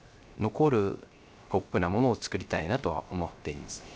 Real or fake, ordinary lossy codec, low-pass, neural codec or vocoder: fake; none; none; codec, 16 kHz, 0.3 kbps, FocalCodec